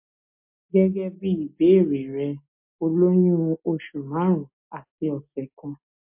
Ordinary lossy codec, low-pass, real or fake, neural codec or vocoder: MP3, 32 kbps; 3.6 kHz; real; none